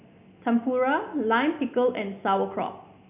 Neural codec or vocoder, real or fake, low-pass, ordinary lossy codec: none; real; 3.6 kHz; none